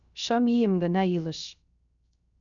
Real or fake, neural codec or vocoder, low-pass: fake; codec, 16 kHz, 0.3 kbps, FocalCodec; 7.2 kHz